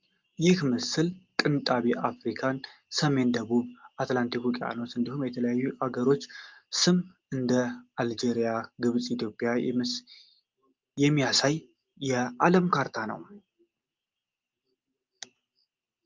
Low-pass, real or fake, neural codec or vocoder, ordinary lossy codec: 7.2 kHz; real; none; Opus, 24 kbps